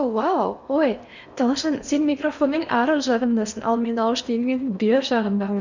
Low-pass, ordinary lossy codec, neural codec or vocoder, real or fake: 7.2 kHz; none; codec, 16 kHz in and 24 kHz out, 0.8 kbps, FocalCodec, streaming, 65536 codes; fake